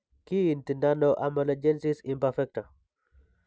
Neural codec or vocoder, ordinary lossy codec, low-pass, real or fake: none; none; none; real